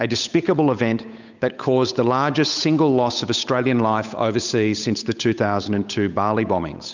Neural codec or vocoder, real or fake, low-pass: codec, 16 kHz, 8 kbps, FunCodec, trained on Chinese and English, 25 frames a second; fake; 7.2 kHz